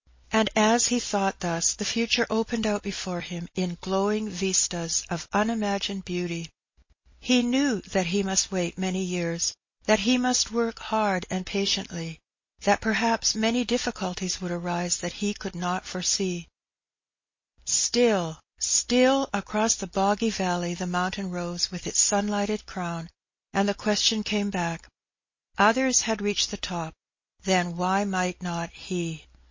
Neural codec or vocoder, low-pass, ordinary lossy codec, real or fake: none; 7.2 kHz; MP3, 32 kbps; real